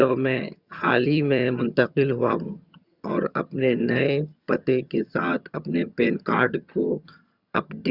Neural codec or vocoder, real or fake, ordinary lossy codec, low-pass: vocoder, 22.05 kHz, 80 mel bands, HiFi-GAN; fake; Opus, 64 kbps; 5.4 kHz